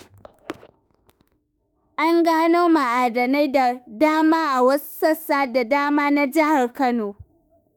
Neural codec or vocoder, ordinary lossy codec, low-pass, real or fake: autoencoder, 48 kHz, 32 numbers a frame, DAC-VAE, trained on Japanese speech; none; none; fake